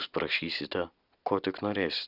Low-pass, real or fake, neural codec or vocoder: 5.4 kHz; real; none